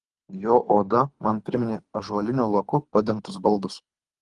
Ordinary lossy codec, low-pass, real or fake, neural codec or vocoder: Opus, 24 kbps; 10.8 kHz; fake; codec, 24 kHz, 3 kbps, HILCodec